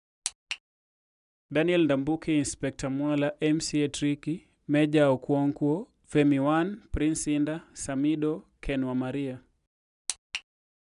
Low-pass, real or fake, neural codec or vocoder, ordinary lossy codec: 10.8 kHz; real; none; none